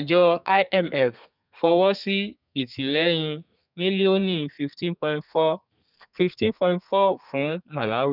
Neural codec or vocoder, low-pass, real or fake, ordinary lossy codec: codec, 32 kHz, 1.9 kbps, SNAC; 5.4 kHz; fake; none